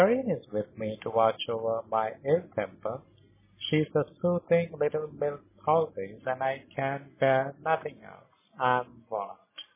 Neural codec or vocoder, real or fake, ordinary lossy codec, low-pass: none; real; MP3, 16 kbps; 3.6 kHz